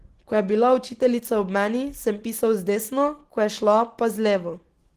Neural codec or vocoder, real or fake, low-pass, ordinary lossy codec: none; real; 14.4 kHz; Opus, 16 kbps